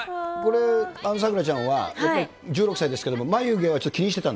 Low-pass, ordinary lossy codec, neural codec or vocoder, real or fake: none; none; none; real